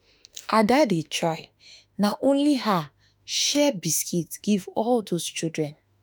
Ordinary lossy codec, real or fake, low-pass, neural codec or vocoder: none; fake; none; autoencoder, 48 kHz, 32 numbers a frame, DAC-VAE, trained on Japanese speech